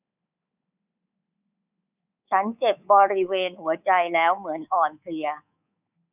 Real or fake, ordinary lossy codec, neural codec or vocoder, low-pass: fake; none; codec, 24 kHz, 3.1 kbps, DualCodec; 3.6 kHz